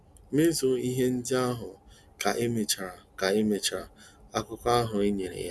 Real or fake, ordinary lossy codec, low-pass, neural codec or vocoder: real; none; none; none